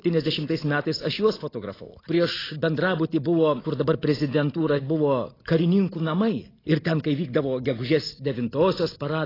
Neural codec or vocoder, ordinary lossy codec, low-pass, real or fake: none; AAC, 24 kbps; 5.4 kHz; real